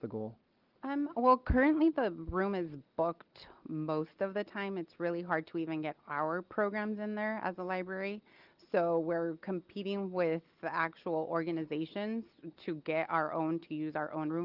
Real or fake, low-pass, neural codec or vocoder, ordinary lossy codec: real; 5.4 kHz; none; Opus, 32 kbps